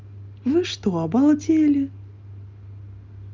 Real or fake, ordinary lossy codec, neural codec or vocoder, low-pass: real; Opus, 32 kbps; none; 7.2 kHz